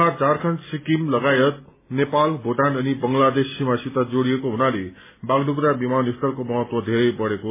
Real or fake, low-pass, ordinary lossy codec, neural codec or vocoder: real; 3.6 kHz; MP3, 16 kbps; none